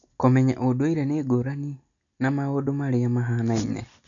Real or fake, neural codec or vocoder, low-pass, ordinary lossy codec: real; none; 7.2 kHz; none